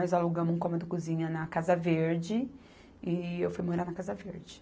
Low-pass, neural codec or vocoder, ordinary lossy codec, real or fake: none; none; none; real